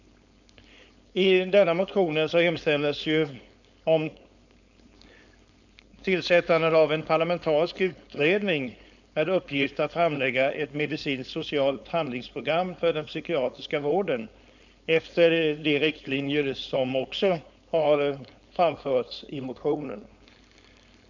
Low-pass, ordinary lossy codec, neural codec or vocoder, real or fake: 7.2 kHz; none; codec, 16 kHz, 4.8 kbps, FACodec; fake